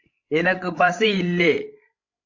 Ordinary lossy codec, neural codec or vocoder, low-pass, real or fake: AAC, 48 kbps; codec, 16 kHz, 16 kbps, FreqCodec, larger model; 7.2 kHz; fake